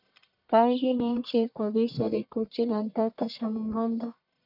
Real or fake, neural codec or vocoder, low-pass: fake; codec, 44.1 kHz, 1.7 kbps, Pupu-Codec; 5.4 kHz